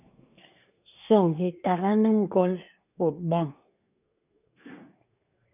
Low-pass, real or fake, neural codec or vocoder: 3.6 kHz; fake; codec, 24 kHz, 1 kbps, SNAC